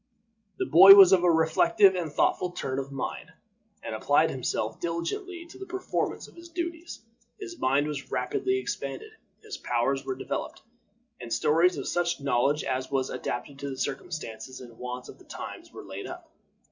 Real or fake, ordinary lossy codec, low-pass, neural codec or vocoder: real; Opus, 64 kbps; 7.2 kHz; none